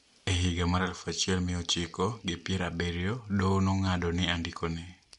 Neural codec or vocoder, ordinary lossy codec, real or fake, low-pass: none; MP3, 64 kbps; real; 10.8 kHz